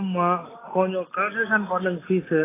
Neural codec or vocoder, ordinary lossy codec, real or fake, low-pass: none; AAC, 16 kbps; real; 3.6 kHz